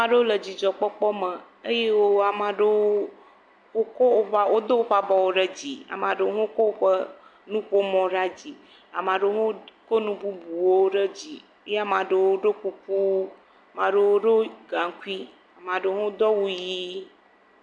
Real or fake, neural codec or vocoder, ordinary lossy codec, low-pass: real; none; AAC, 64 kbps; 9.9 kHz